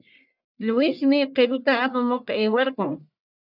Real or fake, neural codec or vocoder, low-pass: fake; codec, 44.1 kHz, 1.7 kbps, Pupu-Codec; 5.4 kHz